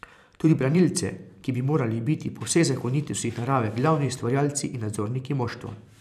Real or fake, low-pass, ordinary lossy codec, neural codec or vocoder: fake; 14.4 kHz; none; vocoder, 44.1 kHz, 128 mel bands every 512 samples, BigVGAN v2